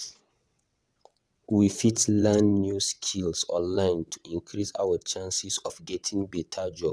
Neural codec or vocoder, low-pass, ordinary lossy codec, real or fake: vocoder, 22.05 kHz, 80 mel bands, WaveNeXt; none; none; fake